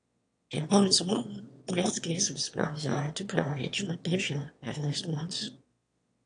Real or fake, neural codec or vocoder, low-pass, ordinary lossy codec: fake; autoencoder, 22.05 kHz, a latent of 192 numbers a frame, VITS, trained on one speaker; 9.9 kHz; AAC, 48 kbps